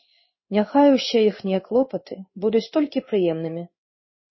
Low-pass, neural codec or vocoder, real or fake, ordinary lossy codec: 7.2 kHz; codec, 16 kHz in and 24 kHz out, 1 kbps, XY-Tokenizer; fake; MP3, 24 kbps